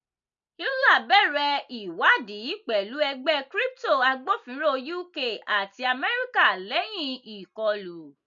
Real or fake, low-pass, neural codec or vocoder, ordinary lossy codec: real; 7.2 kHz; none; none